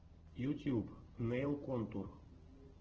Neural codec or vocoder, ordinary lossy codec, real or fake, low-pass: none; Opus, 16 kbps; real; 7.2 kHz